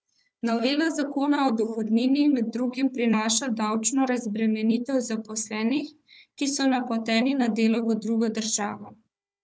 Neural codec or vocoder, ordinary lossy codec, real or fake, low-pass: codec, 16 kHz, 4 kbps, FunCodec, trained on Chinese and English, 50 frames a second; none; fake; none